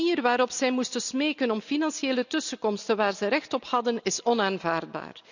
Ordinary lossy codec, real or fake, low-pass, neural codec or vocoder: none; real; 7.2 kHz; none